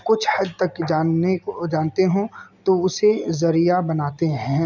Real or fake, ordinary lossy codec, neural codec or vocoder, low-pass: real; none; none; 7.2 kHz